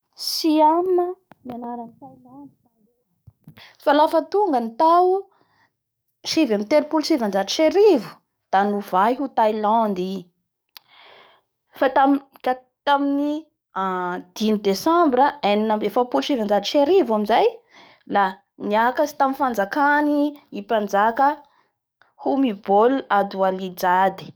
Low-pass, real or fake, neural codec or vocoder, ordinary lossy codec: none; fake; codec, 44.1 kHz, 7.8 kbps, DAC; none